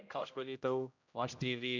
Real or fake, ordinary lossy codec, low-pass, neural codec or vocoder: fake; none; 7.2 kHz; codec, 16 kHz, 0.5 kbps, X-Codec, HuBERT features, trained on general audio